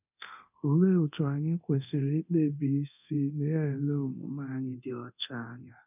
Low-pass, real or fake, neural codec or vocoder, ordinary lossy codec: 3.6 kHz; fake; codec, 24 kHz, 0.9 kbps, DualCodec; none